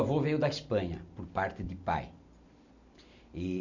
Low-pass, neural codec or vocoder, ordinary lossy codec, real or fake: 7.2 kHz; none; none; real